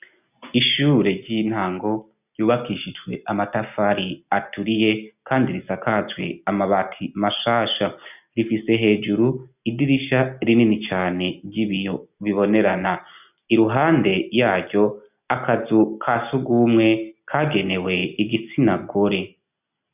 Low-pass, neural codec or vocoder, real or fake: 3.6 kHz; none; real